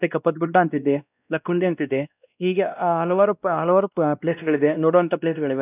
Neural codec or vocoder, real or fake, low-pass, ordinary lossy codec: codec, 16 kHz, 1 kbps, X-Codec, WavLM features, trained on Multilingual LibriSpeech; fake; 3.6 kHz; none